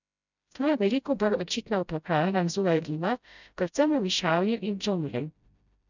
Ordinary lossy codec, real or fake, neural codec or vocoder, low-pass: none; fake; codec, 16 kHz, 0.5 kbps, FreqCodec, smaller model; 7.2 kHz